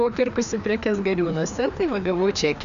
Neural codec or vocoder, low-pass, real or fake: codec, 16 kHz, 2 kbps, FreqCodec, larger model; 7.2 kHz; fake